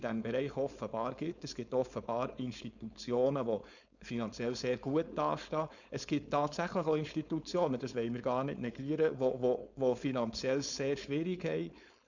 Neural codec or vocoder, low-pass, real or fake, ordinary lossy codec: codec, 16 kHz, 4.8 kbps, FACodec; 7.2 kHz; fake; none